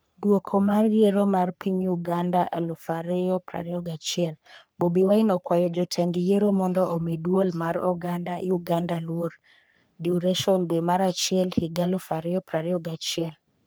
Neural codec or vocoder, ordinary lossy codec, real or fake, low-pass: codec, 44.1 kHz, 3.4 kbps, Pupu-Codec; none; fake; none